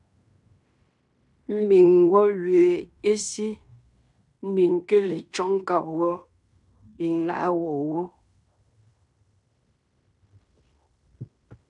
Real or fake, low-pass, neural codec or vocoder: fake; 10.8 kHz; codec, 16 kHz in and 24 kHz out, 0.9 kbps, LongCat-Audio-Codec, fine tuned four codebook decoder